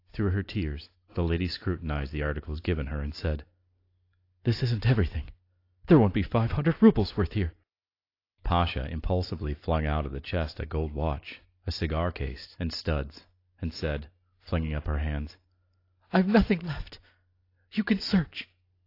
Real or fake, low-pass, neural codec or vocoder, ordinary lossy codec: real; 5.4 kHz; none; AAC, 32 kbps